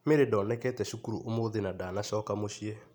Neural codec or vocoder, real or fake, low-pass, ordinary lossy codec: none; real; 19.8 kHz; none